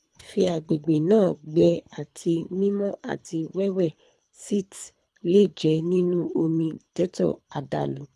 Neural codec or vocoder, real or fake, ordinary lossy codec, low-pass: codec, 24 kHz, 3 kbps, HILCodec; fake; none; none